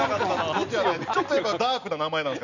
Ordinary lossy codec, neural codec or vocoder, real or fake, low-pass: none; none; real; 7.2 kHz